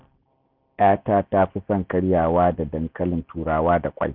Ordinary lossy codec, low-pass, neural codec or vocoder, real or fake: none; 5.4 kHz; none; real